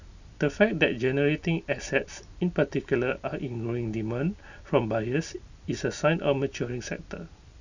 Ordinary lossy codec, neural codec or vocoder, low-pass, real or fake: none; none; 7.2 kHz; real